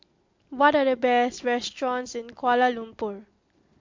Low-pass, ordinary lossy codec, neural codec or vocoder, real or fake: 7.2 kHz; MP3, 48 kbps; none; real